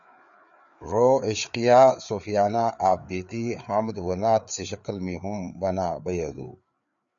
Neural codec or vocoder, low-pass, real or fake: codec, 16 kHz, 4 kbps, FreqCodec, larger model; 7.2 kHz; fake